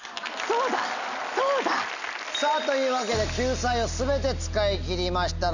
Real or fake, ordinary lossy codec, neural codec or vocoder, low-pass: real; none; none; 7.2 kHz